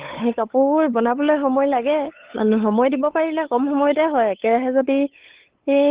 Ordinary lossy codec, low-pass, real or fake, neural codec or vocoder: Opus, 16 kbps; 3.6 kHz; fake; codec, 16 kHz, 8 kbps, FunCodec, trained on LibriTTS, 25 frames a second